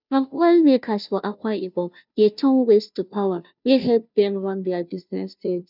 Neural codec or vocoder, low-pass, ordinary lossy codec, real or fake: codec, 16 kHz, 0.5 kbps, FunCodec, trained on Chinese and English, 25 frames a second; 5.4 kHz; none; fake